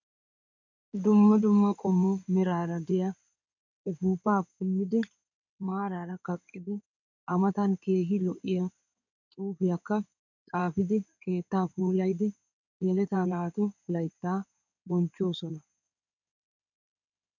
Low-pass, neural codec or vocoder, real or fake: 7.2 kHz; codec, 16 kHz in and 24 kHz out, 2.2 kbps, FireRedTTS-2 codec; fake